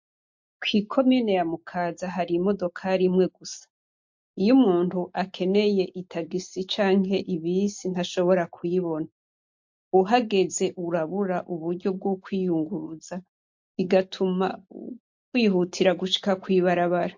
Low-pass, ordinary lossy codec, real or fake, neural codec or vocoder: 7.2 kHz; MP3, 48 kbps; real; none